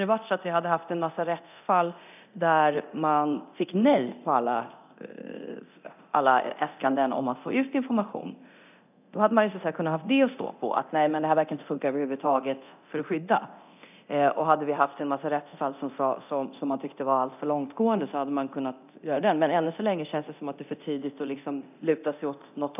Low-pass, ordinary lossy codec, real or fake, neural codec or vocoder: 3.6 kHz; none; fake; codec, 24 kHz, 0.9 kbps, DualCodec